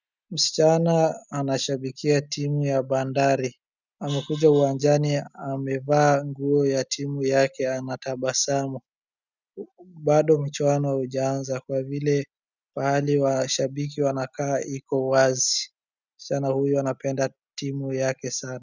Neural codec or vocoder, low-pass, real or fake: none; 7.2 kHz; real